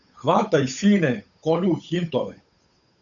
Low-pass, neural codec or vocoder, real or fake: 7.2 kHz; codec, 16 kHz, 8 kbps, FunCodec, trained on Chinese and English, 25 frames a second; fake